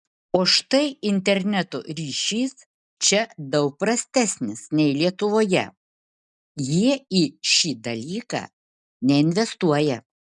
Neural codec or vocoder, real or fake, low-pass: none; real; 10.8 kHz